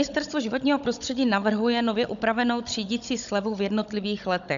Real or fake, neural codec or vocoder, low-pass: fake; codec, 16 kHz, 16 kbps, FunCodec, trained on Chinese and English, 50 frames a second; 7.2 kHz